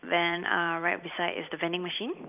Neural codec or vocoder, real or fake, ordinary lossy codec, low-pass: vocoder, 44.1 kHz, 128 mel bands every 256 samples, BigVGAN v2; fake; none; 3.6 kHz